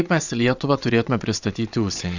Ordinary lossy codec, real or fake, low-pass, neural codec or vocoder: Opus, 64 kbps; fake; 7.2 kHz; vocoder, 22.05 kHz, 80 mel bands, WaveNeXt